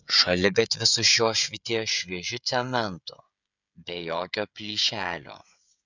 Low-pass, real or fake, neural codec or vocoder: 7.2 kHz; fake; codec, 16 kHz, 16 kbps, FreqCodec, smaller model